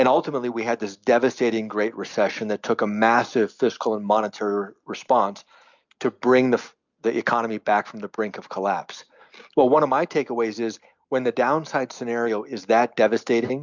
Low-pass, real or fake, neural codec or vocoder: 7.2 kHz; real; none